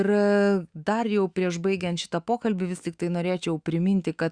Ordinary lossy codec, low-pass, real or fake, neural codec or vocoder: Opus, 64 kbps; 9.9 kHz; fake; autoencoder, 48 kHz, 128 numbers a frame, DAC-VAE, trained on Japanese speech